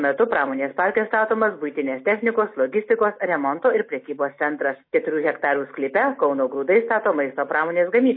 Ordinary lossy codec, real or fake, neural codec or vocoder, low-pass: MP3, 24 kbps; real; none; 5.4 kHz